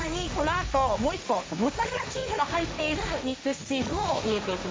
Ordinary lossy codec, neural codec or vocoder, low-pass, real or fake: none; codec, 16 kHz, 1.1 kbps, Voila-Tokenizer; none; fake